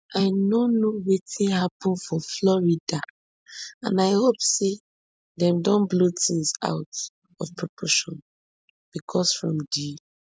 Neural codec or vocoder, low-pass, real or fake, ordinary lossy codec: none; none; real; none